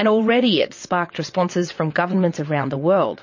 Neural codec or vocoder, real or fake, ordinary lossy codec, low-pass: vocoder, 22.05 kHz, 80 mel bands, WaveNeXt; fake; MP3, 32 kbps; 7.2 kHz